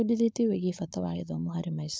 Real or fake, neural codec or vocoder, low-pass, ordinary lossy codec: fake; codec, 16 kHz, 4.8 kbps, FACodec; none; none